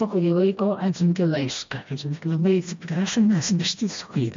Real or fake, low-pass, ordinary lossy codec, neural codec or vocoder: fake; 7.2 kHz; MP3, 48 kbps; codec, 16 kHz, 1 kbps, FreqCodec, smaller model